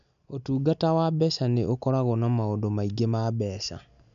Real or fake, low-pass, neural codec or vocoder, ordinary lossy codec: real; 7.2 kHz; none; none